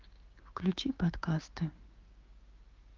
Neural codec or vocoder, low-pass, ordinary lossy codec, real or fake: codec, 16 kHz, 6 kbps, DAC; 7.2 kHz; Opus, 32 kbps; fake